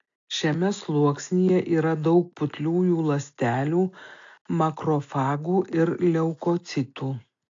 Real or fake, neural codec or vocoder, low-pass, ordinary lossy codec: real; none; 7.2 kHz; AAC, 48 kbps